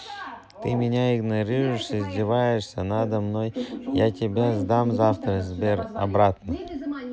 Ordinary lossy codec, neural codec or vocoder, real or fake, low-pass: none; none; real; none